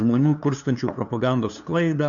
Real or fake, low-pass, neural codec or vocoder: fake; 7.2 kHz; codec, 16 kHz, 2 kbps, FunCodec, trained on LibriTTS, 25 frames a second